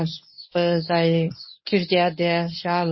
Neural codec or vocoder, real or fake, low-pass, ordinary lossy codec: codec, 24 kHz, 0.9 kbps, WavTokenizer, medium speech release version 1; fake; 7.2 kHz; MP3, 24 kbps